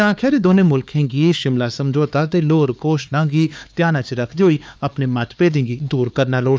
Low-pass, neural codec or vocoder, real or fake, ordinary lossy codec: none; codec, 16 kHz, 4 kbps, X-Codec, HuBERT features, trained on LibriSpeech; fake; none